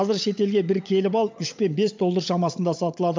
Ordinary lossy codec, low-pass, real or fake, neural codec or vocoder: MP3, 64 kbps; 7.2 kHz; fake; codec, 16 kHz, 16 kbps, FunCodec, trained on LibriTTS, 50 frames a second